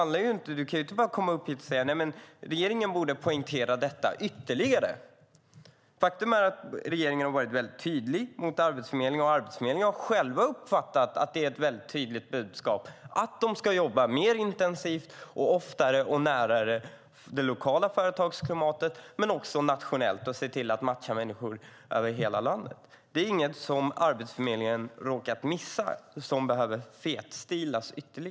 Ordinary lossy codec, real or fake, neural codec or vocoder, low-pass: none; real; none; none